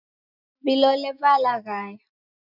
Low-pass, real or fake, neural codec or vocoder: 5.4 kHz; real; none